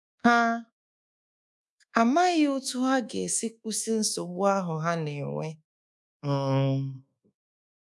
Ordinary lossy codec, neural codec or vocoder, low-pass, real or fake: none; codec, 24 kHz, 1.2 kbps, DualCodec; none; fake